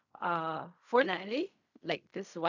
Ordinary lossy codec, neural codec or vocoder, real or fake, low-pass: none; codec, 16 kHz in and 24 kHz out, 0.4 kbps, LongCat-Audio-Codec, fine tuned four codebook decoder; fake; 7.2 kHz